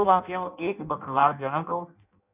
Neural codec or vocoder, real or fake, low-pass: codec, 16 kHz in and 24 kHz out, 0.6 kbps, FireRedTTS-2 codec; fake; 3.6 kHz